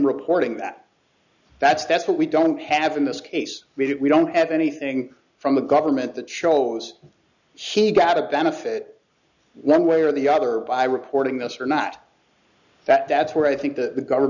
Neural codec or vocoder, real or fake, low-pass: none; real; 7.2 kHz